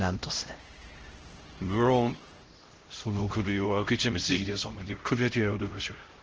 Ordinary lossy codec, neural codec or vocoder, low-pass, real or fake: Opus, 16 kbps; codec, 16 kHz, 0.5 kbps, X-Codec, HuBERT features, trained on LibriSpeech; 7.2 kHz; fake